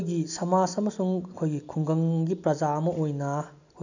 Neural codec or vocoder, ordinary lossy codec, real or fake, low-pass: none; none; real; 7.2 kHz